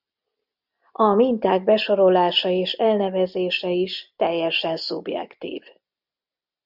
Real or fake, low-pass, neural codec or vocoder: real; 5.4 kHz; none